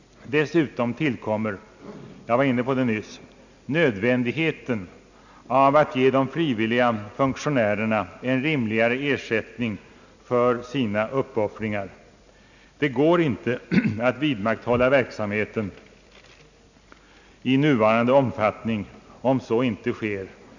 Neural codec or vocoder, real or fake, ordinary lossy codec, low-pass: none; real; none; 7.2 kHz